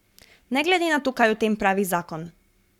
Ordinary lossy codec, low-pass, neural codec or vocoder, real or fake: none; 19.8 kHz; codec, 44.1 kHz, 7.8 kbps, Pupu-Codec; fake